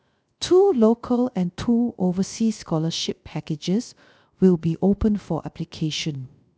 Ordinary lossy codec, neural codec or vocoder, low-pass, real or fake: none; codec, 16 kHz, 0.3 kbps, FocalCodec; none; fake